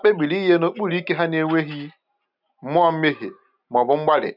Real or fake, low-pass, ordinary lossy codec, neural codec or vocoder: real; 5.4 kHz; none; none